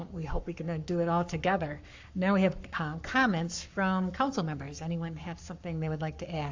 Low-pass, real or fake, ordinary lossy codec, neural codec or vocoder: 7.2 kHz; fake; AAC, 48 kbps; codec, 44.1 kHz, 7.8 kbps, Pupu-Codec